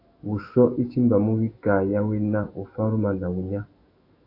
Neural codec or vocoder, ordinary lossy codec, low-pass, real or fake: autoencoder, 48 kHz, 128 numbers a frame, DAC-VAE, trained on Japanese speech; AAC, 32 kbps; 5.4 kHz; fake